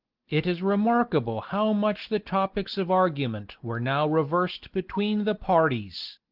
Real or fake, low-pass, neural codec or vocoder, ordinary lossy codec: real; 5.4 kHz; none; Opus, 16 kbps